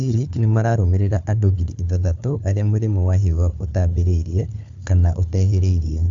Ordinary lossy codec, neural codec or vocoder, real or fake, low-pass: AAC, 64 kbps; codec, 16 kHz, 4 kbps, FunCodec, trained on Chinese and English, 50 frames a second; fake; 7.2 kHz